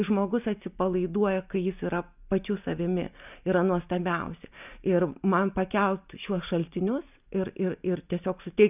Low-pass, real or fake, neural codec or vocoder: 3.6 kHz; real; none